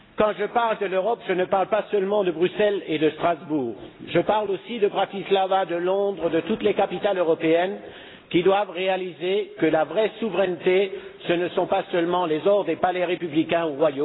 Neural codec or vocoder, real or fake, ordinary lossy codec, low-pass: none; real; AAC, 16 kbps; 7.2 kHz